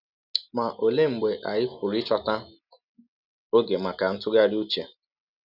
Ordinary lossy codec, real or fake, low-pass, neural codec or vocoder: none; real; 5.4 kHz; none